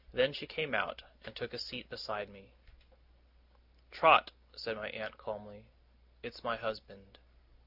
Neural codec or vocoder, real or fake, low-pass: none; real; 5.4 kHz